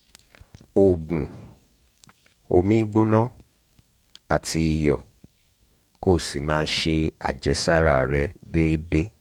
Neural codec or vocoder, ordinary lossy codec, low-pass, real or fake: codec, 44.1 kHz, 2.6 kbps, DAC; none; 19.8 kHz; fake